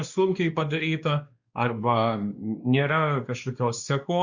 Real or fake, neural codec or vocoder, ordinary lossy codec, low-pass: fake; codec, 16 kHz, 2 kbps, X-Codec, WavLM features, trained on Multilingual LibriSpeech; Opus, 64 kbps; 7.2 kHz